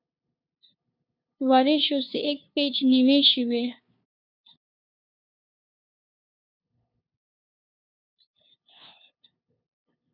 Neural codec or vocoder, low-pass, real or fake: codec, 16 kHz, 2 kbps, FunCodec, trained on LibriTTS, 25 frames a second; 5.4 kHz; fake